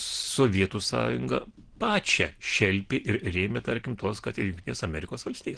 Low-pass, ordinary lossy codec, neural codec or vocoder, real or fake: 9.9 kHz; Opus, 16 kbps; none; real